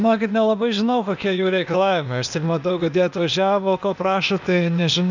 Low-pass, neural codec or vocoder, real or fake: 7.2 kHz; codec, 16 kHz, 0.8 kbps, ZipCodec; fake